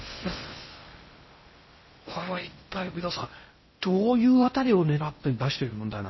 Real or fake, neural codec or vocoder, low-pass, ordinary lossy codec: fake; codec, 16 kHz in and 24 kHz out, 0.6 kbps, FocalCodec, streaming, 2048 codes; 7.2 kHz; MP3, 24 kbps